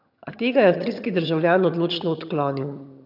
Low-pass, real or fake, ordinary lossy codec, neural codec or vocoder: 5.4 kHz; fake; none; vocoder, 22.05 kHz, 80 mel bands, HiFi-GAN